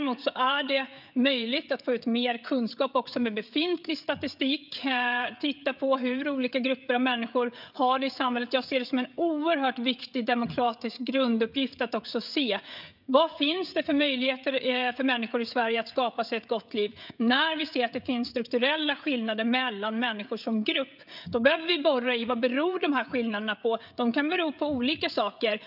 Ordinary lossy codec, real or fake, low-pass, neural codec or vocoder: none; fake; 5.4 kHz; codec, 16 kHz, 16 kbps, FreqCodec, smaller model